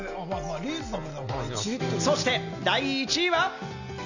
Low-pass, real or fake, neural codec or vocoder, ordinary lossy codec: 7.2 kHz; real; none; none